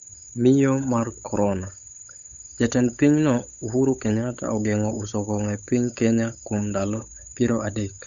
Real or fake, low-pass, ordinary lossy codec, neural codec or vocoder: fake; 7.2 kHz; none; codec, 16 kHz, 8 kbps, FunCodec, trained on Chinese and English, 25 frames a second